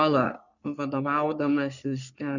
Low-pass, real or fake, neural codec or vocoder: 7.2 kHz; fake; codec, 44.1 kHz, 3.4 kbps, Pupu-Codec